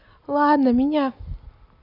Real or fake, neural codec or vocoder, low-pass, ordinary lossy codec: real; none; 5.4 kHz; none